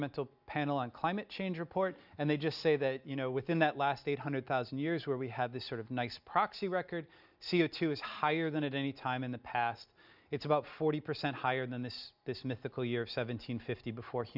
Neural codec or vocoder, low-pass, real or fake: none; 5.4 kHz; real